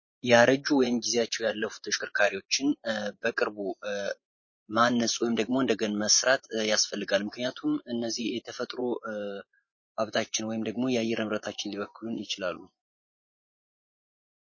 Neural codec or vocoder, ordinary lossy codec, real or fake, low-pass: vocoder, 24 kHz, 100 mel bands, Vocos; MP3, 32 kbps; fake; 7.2 kHz